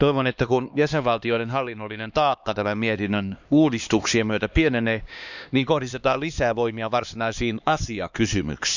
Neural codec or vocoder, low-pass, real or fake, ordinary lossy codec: codec, 16 kHz, 2 kbps, X-Codec, HuBERT features, trained on LibriSpeech; 7.2 kHz; fake; none